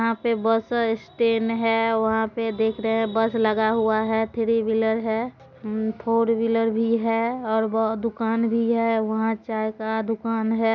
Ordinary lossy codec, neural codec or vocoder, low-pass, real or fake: none; none; 7.2 kHz; real